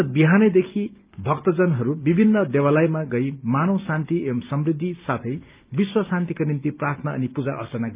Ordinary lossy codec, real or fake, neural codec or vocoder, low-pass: Opus, 24 kbps; real; none; 3.6 kHz